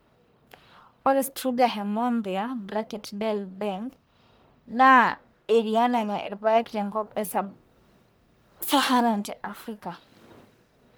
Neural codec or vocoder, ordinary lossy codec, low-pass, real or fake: codec, 44.1 kHz, 1.7 kbps, Pupu-Codec; none; none; fake